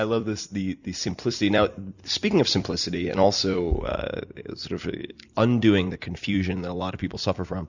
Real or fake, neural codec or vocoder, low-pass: fake; vocoder, 44.1 kHz, 128 mel bands every 256 samples, BigVGAN v2; 7.2 kHz